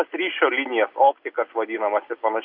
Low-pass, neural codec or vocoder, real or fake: 5.4 kHz; none; real